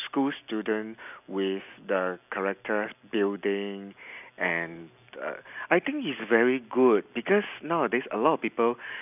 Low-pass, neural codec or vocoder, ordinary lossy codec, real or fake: 3.6 kHz; none; none; real